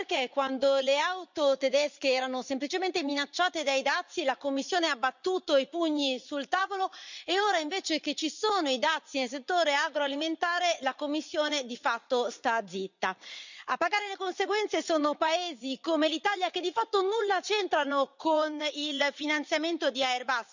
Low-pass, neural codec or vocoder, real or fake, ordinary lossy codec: 7.2 kHz; vocoder, 44.1 kHz, 80 mel bands, Vocos; fake; none